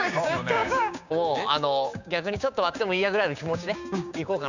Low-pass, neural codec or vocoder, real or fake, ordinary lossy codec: 7.2 kHz; codec, 16 kHz, 6 kbps, DAC; fake; none